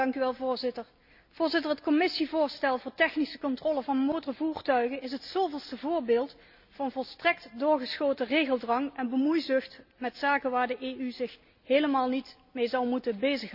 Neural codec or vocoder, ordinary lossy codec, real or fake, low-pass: none; none; real; 5.4 kHz